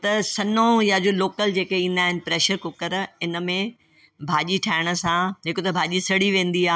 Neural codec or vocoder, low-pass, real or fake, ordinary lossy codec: none; none; real; none